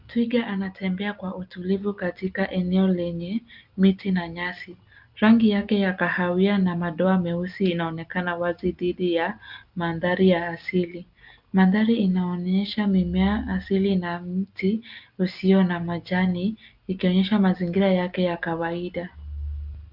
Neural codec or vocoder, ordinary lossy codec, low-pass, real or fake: none; Opus, 24 kbps; 5.4 kHz; real